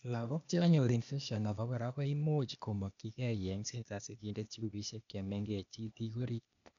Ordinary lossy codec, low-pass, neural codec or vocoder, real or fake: none; 7.2 kHz; codec, 16 kHz, 0.8 kbps, ZipCodec; fake